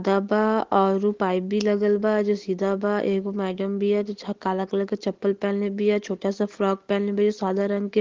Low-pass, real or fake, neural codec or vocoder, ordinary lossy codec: 7.2 kHz; real; none; Opus, 16 kbps